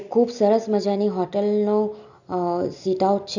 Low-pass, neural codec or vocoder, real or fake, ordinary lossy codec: 7.2 kHz; none; real; none